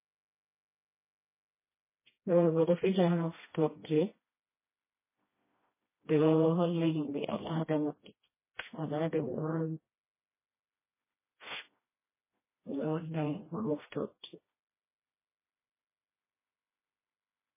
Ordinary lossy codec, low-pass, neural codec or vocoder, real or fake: MP3, 16 kbps; 3.6 kHz; codec, 16 kHz, 1 kbps, FreqCodec, smaller model; fake